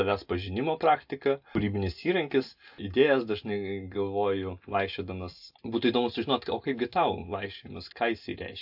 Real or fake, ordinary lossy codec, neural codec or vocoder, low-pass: real; AAC, 48 kbps; none; 5.4 kHz